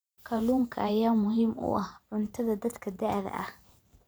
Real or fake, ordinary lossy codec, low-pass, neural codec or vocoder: fake; none; none; vocoder, 44.1 kHz, 128 mel bands every 512 samples, BigVGAN v2